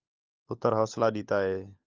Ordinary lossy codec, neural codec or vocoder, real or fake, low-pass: Opus, 24 kbps; codec, 16 kHz, 16 kbps, FunCodec, trained on LibriTTS, 50 frames a second; fake; 7.2 kHz